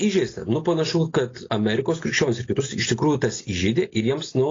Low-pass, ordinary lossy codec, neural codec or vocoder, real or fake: 7.2 kHz; AAC, 32 kbps; none; real